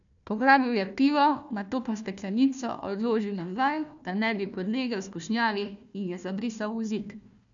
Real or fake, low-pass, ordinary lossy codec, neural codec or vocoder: fake; 7.2 kHz; none; codec, 16 kHz, 1 kbps, FunCodec, trained on Chinese and English, 50 frames a second